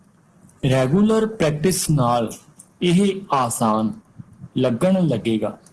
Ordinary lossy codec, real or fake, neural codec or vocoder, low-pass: Opus, 16 kbps; real; none; 10.8 kHz